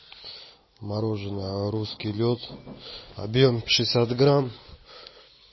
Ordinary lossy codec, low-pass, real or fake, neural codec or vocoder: MP3, 24 kbps; 7.2 kHz; real; none